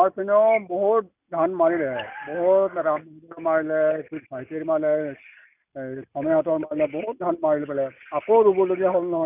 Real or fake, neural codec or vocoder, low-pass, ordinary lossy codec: real; none; 3.6 kHz; none